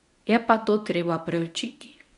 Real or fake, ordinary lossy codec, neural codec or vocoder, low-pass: fake; none; codec, 24 kHz, 0.9 kbps, WavTokenizer, medium speech release version 1; 10.8 kHz